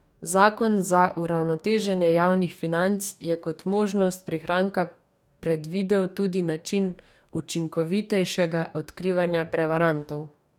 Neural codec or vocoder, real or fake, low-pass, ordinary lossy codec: codec, 44.1 kHz, 2.6 kbps, DAC; fake; 19.8 kHz; none